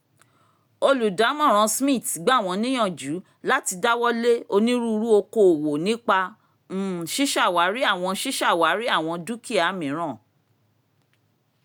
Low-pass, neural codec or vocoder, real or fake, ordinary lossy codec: none; none; real; none